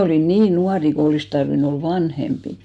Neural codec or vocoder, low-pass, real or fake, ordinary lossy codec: none; none; real; none